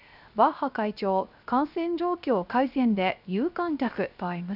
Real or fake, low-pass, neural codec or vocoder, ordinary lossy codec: fake; 5.4 kHz; codec, 16 kHz, 0.3 kbps, FocalCodec; none